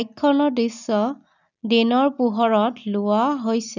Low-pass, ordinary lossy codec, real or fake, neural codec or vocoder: 7.2 kHz; none; real; none